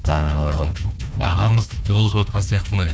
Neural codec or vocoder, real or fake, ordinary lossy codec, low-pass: codec, 16 kHz, 1 kbps, FunCodec, trained on Chinese and English, 50 frames a second; fake; none; none